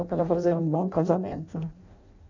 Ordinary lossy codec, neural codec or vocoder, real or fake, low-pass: none; codec, 16 kHz in and 24 kHz out, 0.6 kbps, FireRedTTS-2 codec; fake; 7.2 kHz